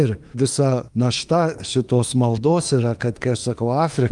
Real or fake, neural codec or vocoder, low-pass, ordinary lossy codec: fake; autoencoder, 48 kHz, 32 numbers a frame, DAC-VAE, trained on Japanese speech; 10.8 kHz; Opus, 32 kbps